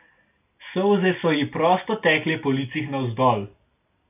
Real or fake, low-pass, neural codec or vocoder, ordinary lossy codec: real; 3.6 kHz; none; none